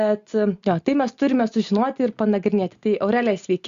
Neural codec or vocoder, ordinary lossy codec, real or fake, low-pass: none; Opus, 64 kbps; real; 7.2 kHz